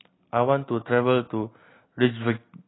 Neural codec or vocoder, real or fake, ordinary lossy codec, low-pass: none; real; AAC, 16 kbps; 7.2 kHz